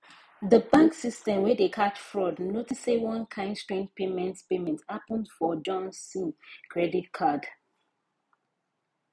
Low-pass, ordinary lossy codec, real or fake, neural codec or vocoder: none; none; real; none